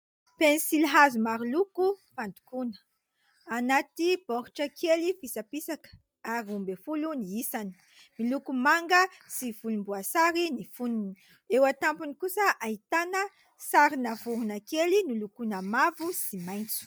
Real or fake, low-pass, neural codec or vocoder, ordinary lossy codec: real; 19.8 kHz; none; MP3, 96 kbps